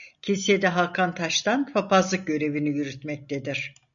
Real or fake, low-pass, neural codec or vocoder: real; 7.2 kHz; none